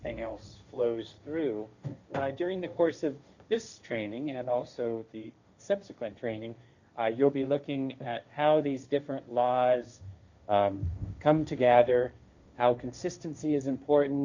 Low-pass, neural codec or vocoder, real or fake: 7.2 kHz; codec, 16 kHz, 1.1 kbps, Voila-Tokenizer; fake